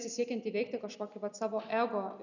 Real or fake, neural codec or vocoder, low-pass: real; none; 7.2 kHz